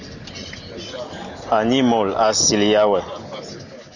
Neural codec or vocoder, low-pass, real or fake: none; 7.2 kHz; real